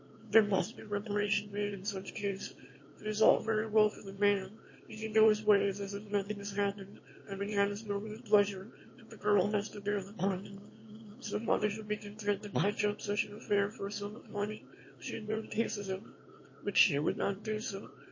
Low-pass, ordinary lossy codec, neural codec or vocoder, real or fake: 7.2 kHz; MP3, 32 kbps; autoencoder, 22.05 kHz, a latent of 192 numbers a frame, VITS, trained on one speaker; fake